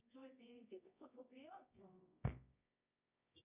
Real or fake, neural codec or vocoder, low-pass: fake; codec, 24 kHz, 0.9 kbps, WavTokenizer, medium music audio release; 3.6 kHz